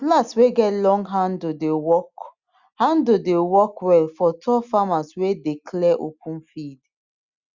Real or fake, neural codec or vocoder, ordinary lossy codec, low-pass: real; none; Opus, 64 kbps; 7.2 kHz